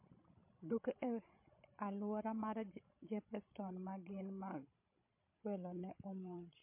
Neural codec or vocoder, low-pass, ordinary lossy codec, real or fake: codec, 16 kHz, 16 kbps, FreqCodec, larger model; 3.6 kHz; MP3, 24 kbps; fake